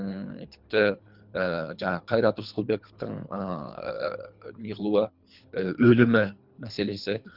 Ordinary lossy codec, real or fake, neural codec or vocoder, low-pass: Opus, 64 kbps; fake; codec, 24 kHz, 3 kbps, HILCodec; 5.4 kHz